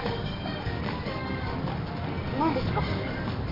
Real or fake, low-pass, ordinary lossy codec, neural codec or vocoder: fake; 5.4 kHz; MP3, 32 kbps; codec, 44.1 kHz, 7.8 kbps, DAC